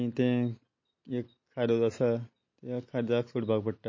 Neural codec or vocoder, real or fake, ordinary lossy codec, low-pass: none; real; MP3, 32 kbps; 7.2 kHz